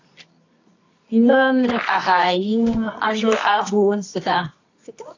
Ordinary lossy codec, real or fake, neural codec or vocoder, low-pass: AAC, 48 kbps; fake; codec, 24 kHz, 0.9 kbps, WavTokenizer, medium music audio release; 7.2 kHz